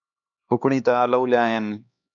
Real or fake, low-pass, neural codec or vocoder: fake; 7.2 kHz; codec, 16 kHz, 2 kbps, X-Codec, HuBERT features, trained on LibriSpeech